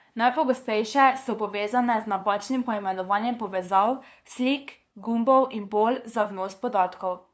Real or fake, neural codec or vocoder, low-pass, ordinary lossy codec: fake; codec, 16 kHz, 2 kbps, FunCodec, trained on LibriTTS, 25 frames a second; none; none